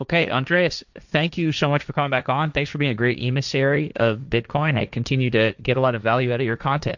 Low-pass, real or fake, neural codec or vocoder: 7.2 kHz; fake; codec, 16 kHz, 1.1 kbps, Voila-Tokenizer